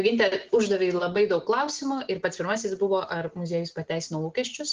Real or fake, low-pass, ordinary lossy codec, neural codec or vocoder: real; 7.2 kHz; Opus, 16 kbps; none